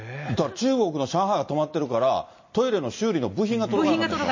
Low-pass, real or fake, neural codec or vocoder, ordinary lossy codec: 7.2 kHz; real; none; MP3, 32 kbps